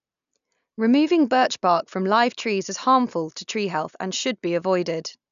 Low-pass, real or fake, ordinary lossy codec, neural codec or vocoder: 7.2 kHz; real; none; none